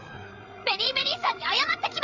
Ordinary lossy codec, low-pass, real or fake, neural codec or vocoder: Opus, 64 kbps; 7.2 kHz; fake; codec, 16 kHz, 8 kbps, FreqCodec, larger model